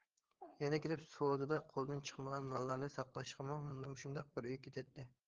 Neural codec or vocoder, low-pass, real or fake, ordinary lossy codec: codec, 16 kHz, 4 kbps, FreqCodec, larger model; 7.2 kHz; fake; Opus, 16 kbps